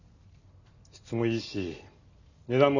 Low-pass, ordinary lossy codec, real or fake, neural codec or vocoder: 7.2 kHz; none; real; none